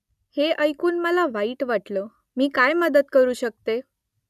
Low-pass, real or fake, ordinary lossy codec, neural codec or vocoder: 14.4 kHz; real; none; none